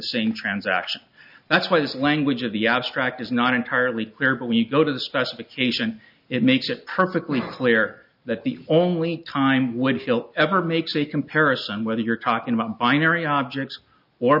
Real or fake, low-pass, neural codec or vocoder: real; 5.4 kHz; none